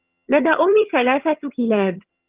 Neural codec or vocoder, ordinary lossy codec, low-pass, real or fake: vocoder, 22.05 kHz, 80 mel bands, HiFi-GAN; Opus, 32 kbps; 3.6 kHz; fake